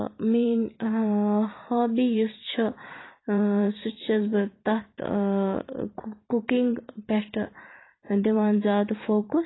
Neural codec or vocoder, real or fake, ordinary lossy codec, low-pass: vocoder, 44.1 kHz, 128 mel bands every 512 samples, BigVGAN v2; fake; AAC, 16 kbps; 7.2 kHz